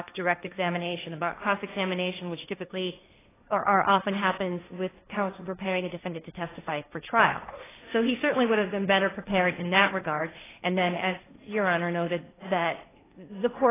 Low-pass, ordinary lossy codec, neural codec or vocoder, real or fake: 3.6 kHz; AAC, 16 kbps; codec, 16 kHz, 1.1 kbps, Voila-Tokenizer; fake